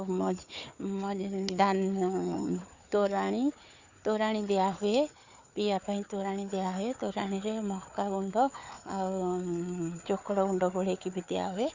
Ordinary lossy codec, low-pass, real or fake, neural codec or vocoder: Opus, 64 kbps; 7.2 kHz; fake; codec, 16 kHz, 4 kbps, FunCodec, trained on Chinese and English, 50 frames a second